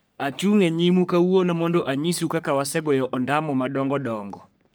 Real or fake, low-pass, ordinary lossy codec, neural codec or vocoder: fake; none; none; codec, 44.1 kHz, 3.4 kbps, Pupu-Codec